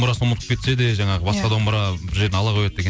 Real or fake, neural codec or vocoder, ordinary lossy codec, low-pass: real; none; none; none